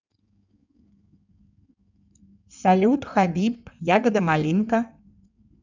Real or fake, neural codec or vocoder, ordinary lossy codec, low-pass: fake; codec, 16 kHz in and 24 kHz out, 1.1 kbps, FireRedTTS-2 codec; none; 7.2 kHz